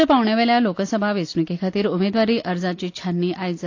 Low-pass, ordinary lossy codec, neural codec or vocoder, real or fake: 7.2 kHz; AAC, 48 kbps; none; real